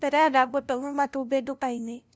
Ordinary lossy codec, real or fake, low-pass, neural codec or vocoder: none; fake; none; codec, 16 kHz, 0.5 kbps, FunCodec, trained on LibriTTS, 25 frames a second